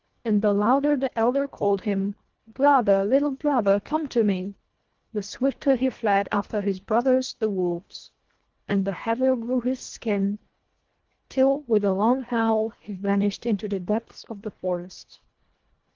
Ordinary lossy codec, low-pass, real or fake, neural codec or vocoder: Opus, 16 kbps; 7.2 kHz; fake; codec, 24 kHz, 1.5 kbps, HILCodec